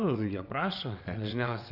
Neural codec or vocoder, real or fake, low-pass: vocoder, 22.05 kHz, 80 mel bands, WaveNeXt; fake; 5.4 kHz